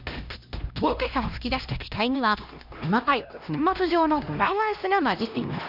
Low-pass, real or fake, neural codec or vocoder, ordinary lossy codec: 5.4 kHz; fake; codec, 16 kHz, 1 kbps, X-Codec, HuBERT features, trained on LibriSpeech; none